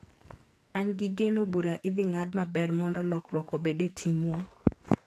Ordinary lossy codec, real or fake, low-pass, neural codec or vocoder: AAC, 64 kbps; fake; 14.4 kHz; codec, 44.1 kHz, 2.6 kbps, SNAC